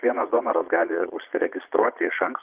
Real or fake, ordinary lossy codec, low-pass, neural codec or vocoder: fake; Opus, 32 kbps; 3.6 kHz; vocoder, 22.05 kHz, 80 mel bands, Vocos